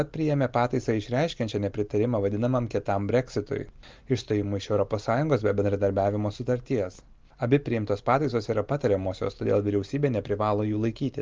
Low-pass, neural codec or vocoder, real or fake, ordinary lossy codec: 7.2 kHz; none; real; Opus, 24 kbps